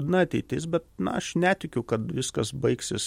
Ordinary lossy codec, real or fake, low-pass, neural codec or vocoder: MP3, 64 kbps; real; 19.8 kHz; none